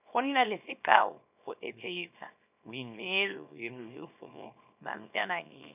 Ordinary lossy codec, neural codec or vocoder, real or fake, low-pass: none; codec, 24 kHz, 0.9 kbps, WavTokenizer, small release; fake; 3.6 kHz